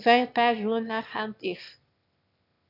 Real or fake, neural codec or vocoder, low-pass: fake; autoencoder, 22.05 kHz, a latent of 192 numbers a frame, VITS, trained on one speaker; 5.4 kHz